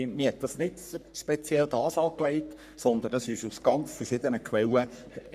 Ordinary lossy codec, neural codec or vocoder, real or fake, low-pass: none; codec, 44.1 kHz, 3.4 kbps, Pupu-Codec; fake; 14.4 kHz